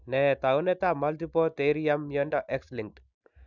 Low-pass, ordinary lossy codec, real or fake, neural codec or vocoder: 7.2 kHz; none; real; none